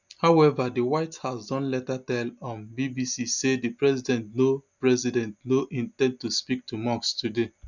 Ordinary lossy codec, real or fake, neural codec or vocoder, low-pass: none; real; none; 7.2 kHz